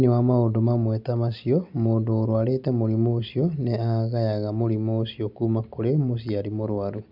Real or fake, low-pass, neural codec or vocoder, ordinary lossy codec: real; 5.4 kHz; none; none